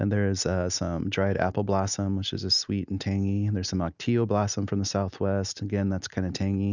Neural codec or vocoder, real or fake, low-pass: none; real; 7.2 kHz